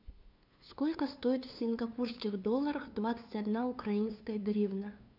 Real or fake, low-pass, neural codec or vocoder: fake; 5.4 kHz; codec, 16 kHz, 2 kbps, FunCodec, trained on LibriTTS, 25 frames a second